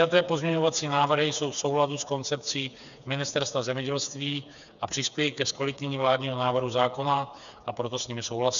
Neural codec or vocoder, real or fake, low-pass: codec, 16 kHz, 4 kbps, FreqCodec, smaller model; fake; 7.2 kHz